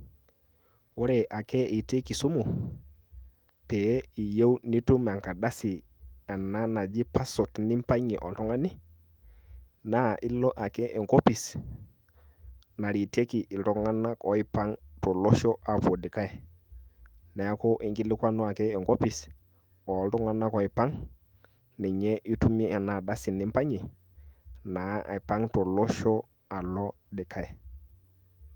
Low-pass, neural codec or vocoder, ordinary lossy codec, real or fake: 19.8 kHz; autoencoder, 48 kHz, 128 numbers a frame, DAC-VAE, trained on Japanese speech; Opus, 32 kbps; fake